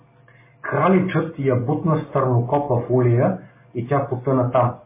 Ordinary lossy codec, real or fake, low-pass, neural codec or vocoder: MP3, 16 kbps; real; 3.6 kHz; none